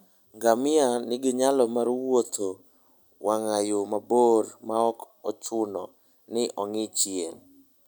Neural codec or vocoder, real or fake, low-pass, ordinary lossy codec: none; real; none; none